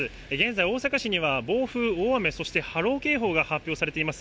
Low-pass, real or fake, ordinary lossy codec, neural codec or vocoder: none; real; none; none